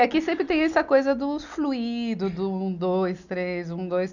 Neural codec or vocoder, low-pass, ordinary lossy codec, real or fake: none; 7.2 kHz; none; real